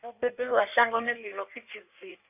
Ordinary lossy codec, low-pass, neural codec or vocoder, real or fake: none; 3.6 kHz; codec, 16 kHz in and 24 kHz out, 1.1 kbps, FireRedTTS-2 codec; fake